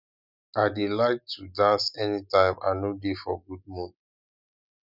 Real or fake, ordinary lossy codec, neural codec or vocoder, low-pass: real; none; none; 5.4 kHz